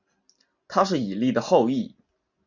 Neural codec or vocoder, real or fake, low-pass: none; real; 7.2 kHz